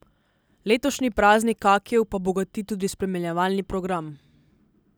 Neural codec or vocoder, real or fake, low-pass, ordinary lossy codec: none; real; none; none